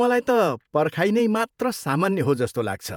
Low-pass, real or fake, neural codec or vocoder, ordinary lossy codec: 19.8 kHz; fake; vocoder, 48 kHz, 128 mel bands, Vocos; none